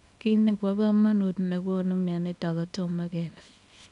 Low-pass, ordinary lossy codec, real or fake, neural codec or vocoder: 10.8 kHz; none; fake; codec, 24 kHz, 0.9 kbps, WavTokenizer, small release